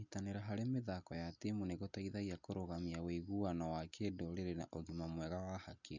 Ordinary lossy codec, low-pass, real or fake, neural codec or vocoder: none; 7.2 kHz; real; none